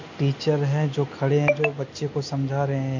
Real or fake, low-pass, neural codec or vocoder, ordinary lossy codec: real; 7.2 kHz; none; MP3, 48 kbps